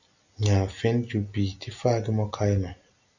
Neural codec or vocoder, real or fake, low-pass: none; real; 7.2 kHz